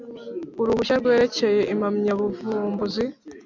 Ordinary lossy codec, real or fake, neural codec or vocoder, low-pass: MP3, 64 kbps; real; none; 7.2 kHz